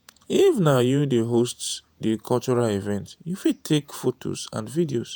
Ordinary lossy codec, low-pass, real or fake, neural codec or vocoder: none; none; fake; vocoder, 48 kHz, 128 mel bands, Vocos